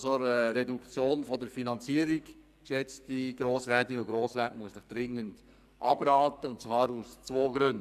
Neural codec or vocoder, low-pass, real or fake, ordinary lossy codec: codec, 44.1 kHz, 2.6 kbps, SNAC; 14.4 kHz; fake; none